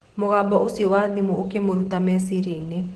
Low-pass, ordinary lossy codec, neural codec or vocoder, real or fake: 10.8 kHz; Opus, 16 kbps; vocoder, 24 kHz, 100 mel bands, Vocos; fake